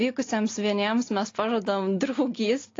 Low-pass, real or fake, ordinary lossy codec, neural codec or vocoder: 7.2 kHz; real; AAC, 32 kbps; none